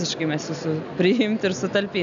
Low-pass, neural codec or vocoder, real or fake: 7.2 kHz; none; real